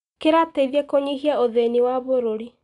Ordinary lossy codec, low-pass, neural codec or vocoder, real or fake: MP3, 96 kbps; 10.8 kHz; none; real